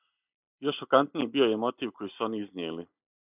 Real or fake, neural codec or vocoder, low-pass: real; none; 3.6 kHz